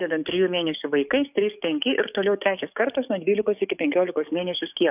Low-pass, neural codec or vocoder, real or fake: 3.6 kHz; codec, 44.1 kHz, 7.8 kbps, DAC; fake